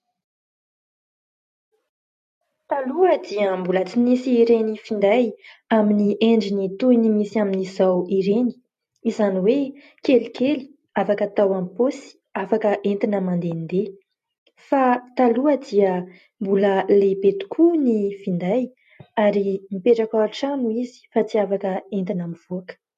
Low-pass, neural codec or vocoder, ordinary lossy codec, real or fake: 14.4 kHz; none; MP3, 64 kbps; real